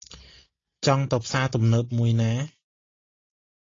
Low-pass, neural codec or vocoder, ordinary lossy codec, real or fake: 7.2 kHz; none; AAC, 32 kbps; real